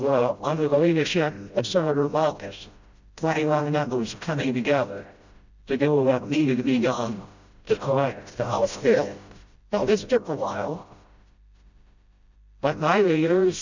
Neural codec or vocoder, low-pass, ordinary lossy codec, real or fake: codec, 16 kHz, 0.5 kbps, FreqCodec, smaller model; 7.2 kHz; Opus, 64 kbps; fake